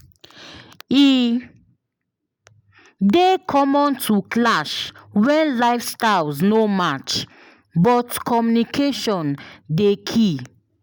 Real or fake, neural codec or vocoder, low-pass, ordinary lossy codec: real; none; none; none